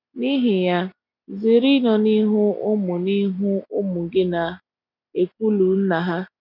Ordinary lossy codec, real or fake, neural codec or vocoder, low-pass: none; real; none; 5.4 kHz